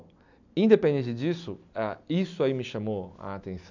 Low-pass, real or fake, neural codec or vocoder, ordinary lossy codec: 7.2 kHz; real; none; none